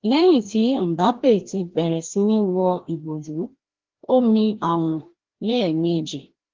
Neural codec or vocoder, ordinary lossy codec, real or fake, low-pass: codec, 16 kHz, 1 kbps, FreqCodec, larger model; Opus, 16 kbps; fake; 7.2 kHz